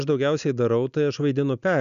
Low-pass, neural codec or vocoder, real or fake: 7.2 kHz; none; real